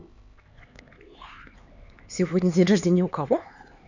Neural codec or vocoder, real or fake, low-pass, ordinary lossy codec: codec, 16 kHz, 4 kbps, X-Codec, HuBERT features, trained on LibriSpeech; fake; 7.2 kHz; Opus, 64 kbps